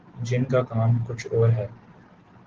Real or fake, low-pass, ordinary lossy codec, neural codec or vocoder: real; 7.2 kHz; Opus, 16 kbps; none